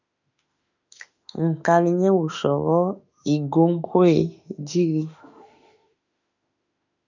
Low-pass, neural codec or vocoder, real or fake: 7.2 kHz; autoencoder, 48 kHz, 32 numbers a frame, DAC-VAE, trained on Japanese speech; fake